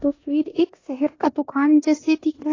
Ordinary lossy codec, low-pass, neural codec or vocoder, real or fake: AAC, 32 kbps; 7.2 kHz; codec, 16 kHz in and 24 kHz out, 0.9 kbps, LongCat-Audio-Codec, four codebook decoder; fake